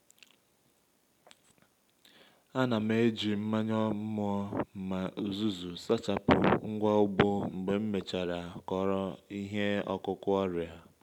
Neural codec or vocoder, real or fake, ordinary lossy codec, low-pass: none; real; none; 19.8 kHz